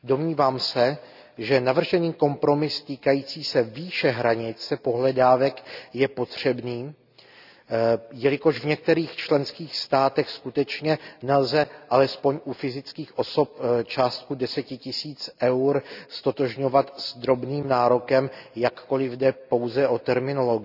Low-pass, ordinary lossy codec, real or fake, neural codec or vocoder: 5.4 kHz; none; real; none